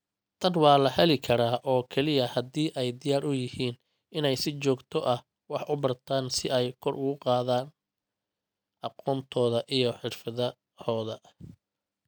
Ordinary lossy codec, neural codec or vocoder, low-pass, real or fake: none; none; none; real